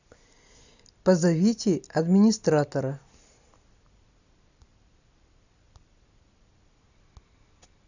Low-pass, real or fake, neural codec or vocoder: 7.2 kHz; real; none